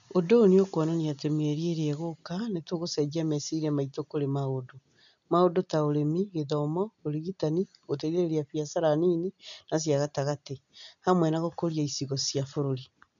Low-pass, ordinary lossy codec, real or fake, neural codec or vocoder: 7.2 kHz; none; real; none